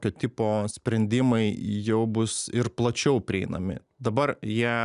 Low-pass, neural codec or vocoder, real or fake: 10.8 kHz; none; real